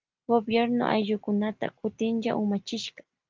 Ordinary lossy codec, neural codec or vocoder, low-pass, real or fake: Opus, 32 kbps; none; 7.2 kHz; real